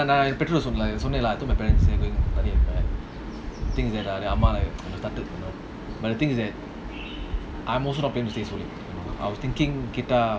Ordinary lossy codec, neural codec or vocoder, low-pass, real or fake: none; none; none; real